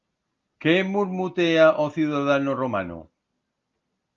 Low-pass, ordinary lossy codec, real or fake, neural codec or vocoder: 7.2 kHz; Opus, 32 kbps; real; none